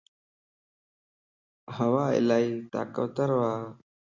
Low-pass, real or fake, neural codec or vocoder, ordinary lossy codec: 7.2 kHz; real; none; Opus, 64 kbps